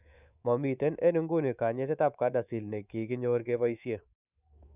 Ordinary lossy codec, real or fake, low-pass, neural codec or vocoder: none; fake; 3.6 kHz; codec, 24 kHz, 3.1 kbps, DualCodec